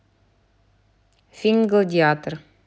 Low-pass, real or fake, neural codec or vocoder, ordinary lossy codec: none; real; none; none